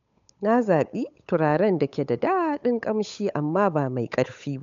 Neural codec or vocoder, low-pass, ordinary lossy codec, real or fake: codec, 16 kHz, 8 kbps, FunCodec, trained on Chinese and English, 25 frames a second; 7.2 kHz; MP3, 64 kbps; fake